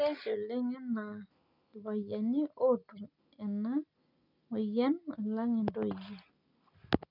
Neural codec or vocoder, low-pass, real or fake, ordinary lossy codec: none; 5.4 kHz; real; none